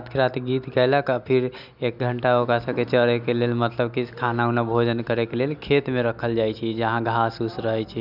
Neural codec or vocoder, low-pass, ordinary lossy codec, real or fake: none; 5.4 kHz; none; real